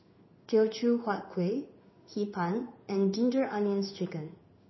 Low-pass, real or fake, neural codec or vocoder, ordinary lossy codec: 7.2 kHz; fake; codec, 16 kHz in and 24 kHz out, 1 kbps, XY-Tokenizer; MP3, 24 kbps